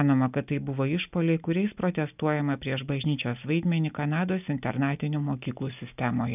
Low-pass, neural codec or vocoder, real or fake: 3.6 kHz; none; real